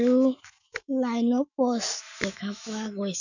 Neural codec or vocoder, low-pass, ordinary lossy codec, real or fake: autoencoder, 48 kHz, 128 numbers a frame, DAC-VAE, trained on Japanese speech; 7.2 kHz; none; fake